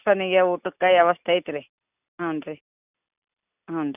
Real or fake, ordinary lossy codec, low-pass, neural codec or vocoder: real; none; 3.6 kHz; none